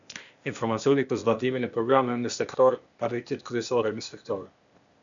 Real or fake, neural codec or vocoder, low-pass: fake; codec, 16 kHz, 0.8 kbps, ZipCodec; 7.2 kHz